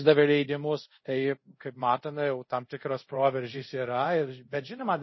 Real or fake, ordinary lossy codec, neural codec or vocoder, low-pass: fake; MP3, 24 kbps; codec, 24 kHz, 0.5 kbps, DualCodec; 7.2 kHz